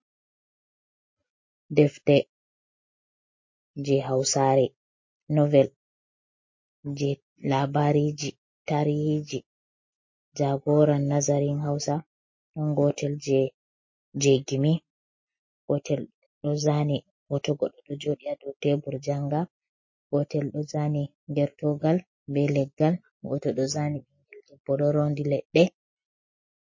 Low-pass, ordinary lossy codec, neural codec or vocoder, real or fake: 7.2 kHz; MP3, 32 kbps; none; real